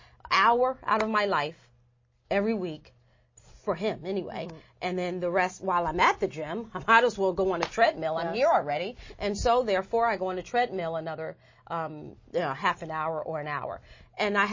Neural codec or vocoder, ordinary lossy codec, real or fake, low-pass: none; MP3, 32 kbps; real; 7.2 kHz